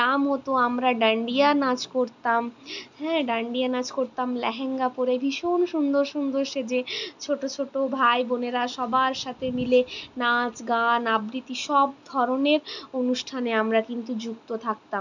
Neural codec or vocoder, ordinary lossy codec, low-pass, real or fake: none; none; 7.2 kHz; real